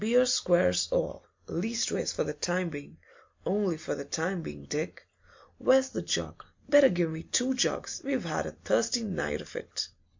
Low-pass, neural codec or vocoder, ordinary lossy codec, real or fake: 7.2 kHz; none; MP3, 48 kbps; real